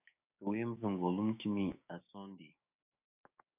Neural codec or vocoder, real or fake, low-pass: codec, 16 kHz, 6 kbps, DAC; fake; 3.6 kHz